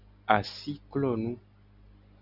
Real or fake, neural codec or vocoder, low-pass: real; none; 5.4 kHz